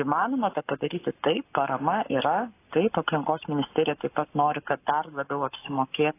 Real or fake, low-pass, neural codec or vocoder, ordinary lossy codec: fake; 3.6 kHz; codec, 44.1 kHz, 7.8 kbps, Pupu-Codec; AAC, 24 kbps